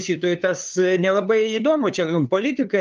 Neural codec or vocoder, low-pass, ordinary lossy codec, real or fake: codec, 16 kHz, 2 kbps, FunCodec, trained on LibriTTS, 25 frames a second; 7.2 kHz; Opus, 24 kbps; fake